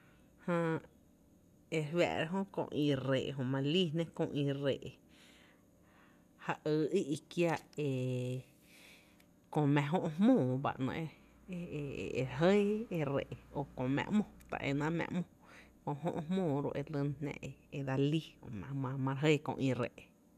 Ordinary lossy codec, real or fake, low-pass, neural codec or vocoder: none; real; 14.4 kHz; none